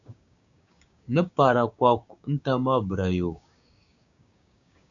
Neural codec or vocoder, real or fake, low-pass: codec, 16 kHz, 6 kbps, DAC; fake; 7.2 kHz